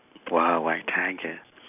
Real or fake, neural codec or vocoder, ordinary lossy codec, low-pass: real; none; none; 3.6 kHz